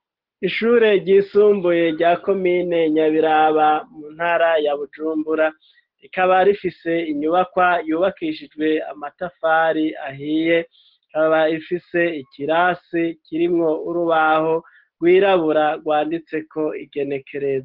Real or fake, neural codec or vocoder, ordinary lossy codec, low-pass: real; none; Opus, 16 kbps; 5.4 kHz